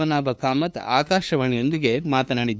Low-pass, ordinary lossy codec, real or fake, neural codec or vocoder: none; none; fake; codec, 16 kHz, 2 kbps, FunCodec, trained on LibriTTS, 25 frames a second